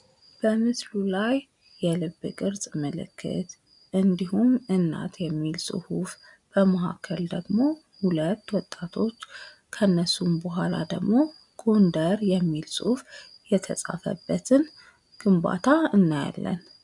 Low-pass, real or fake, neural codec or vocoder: 10.8 kHz; real; none